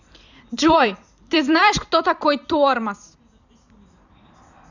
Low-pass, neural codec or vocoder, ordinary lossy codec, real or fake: 7.2 kHz; vocoder, 44.1 kHz, 128 mel bands every 256 samples, BigVGAN v2; none; fake